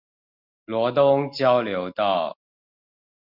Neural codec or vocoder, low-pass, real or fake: none; 5.4 kHz; real